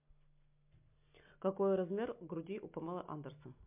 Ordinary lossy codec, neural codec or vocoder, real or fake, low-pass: MP3, 32 kbps; none; real; 3.6 kHz